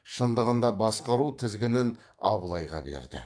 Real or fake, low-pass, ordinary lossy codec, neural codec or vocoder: fake; 9.9 kHz; AAC, 64 kbps; codec, 44.1 kHz, 2.6 kbps, SNAC